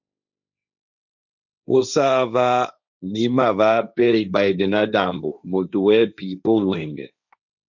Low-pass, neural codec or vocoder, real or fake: 7.2 kHz; codec, 16 kHz, 1.1 kbps, Voila-Tokenizer; fake